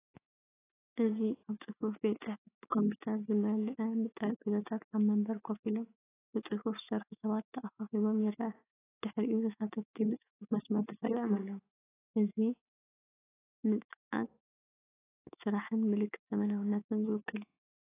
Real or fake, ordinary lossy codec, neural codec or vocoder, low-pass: fake; AAC, 16 kbps; autoencoder, 48 kHz, 128 numbers a frame, DAC-VAE, trained on Japanese speech; 3.6 kHz